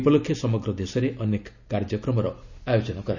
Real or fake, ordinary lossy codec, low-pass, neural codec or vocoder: real; none; 7.2 kHz; none